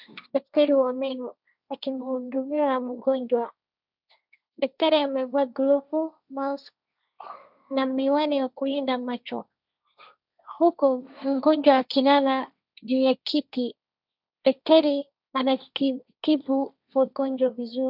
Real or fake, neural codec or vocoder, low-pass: fake; codec, 16 kHz, 1.1 kbps, Voila-Tokenizer; 5.4 kHz